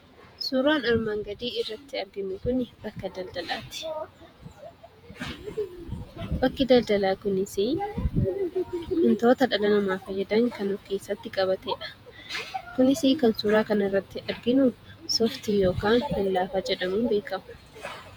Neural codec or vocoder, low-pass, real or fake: vocoder, 48 kHz, 128 mel bands, Vocos; 19.8 kHz; fake